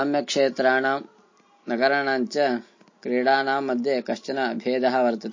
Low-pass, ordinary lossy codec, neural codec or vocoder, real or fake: 7.2 kHz; MP3, 32 kbps; none; real